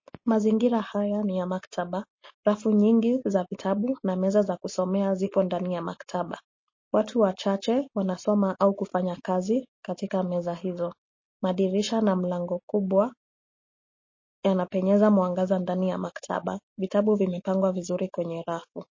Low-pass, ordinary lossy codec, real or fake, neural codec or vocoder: 7.2 kHz; MP3, 32 kbps; real; none